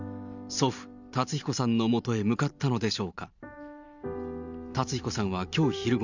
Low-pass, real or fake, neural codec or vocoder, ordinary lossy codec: 7.2 kHz; fake; vocoder, 44.1 kHz, 128 mel bands every 512 samples, BigVGAN v2; none